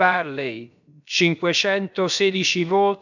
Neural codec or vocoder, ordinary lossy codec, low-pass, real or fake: codec, 16 kHz, about 1 kbps, DyCAST, with the encoder's durations; none; 7.2 kHz; fake